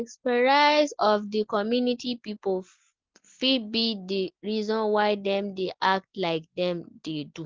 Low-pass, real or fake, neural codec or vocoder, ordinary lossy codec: 7.2 kHz; real; none; Opus, 16 kbps